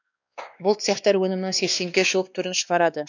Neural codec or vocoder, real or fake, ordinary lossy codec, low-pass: codec, 16 kHz, 2 kbps, X-Codec, WavLM features, trained on Multilingual LibriSpeech; fake; none; 7.2 kHz